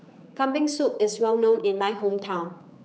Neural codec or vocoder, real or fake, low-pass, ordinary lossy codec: codec, 16 kHz, 4 kbps, X-Codec, HuBERT features, trained on general audio; fake; none; none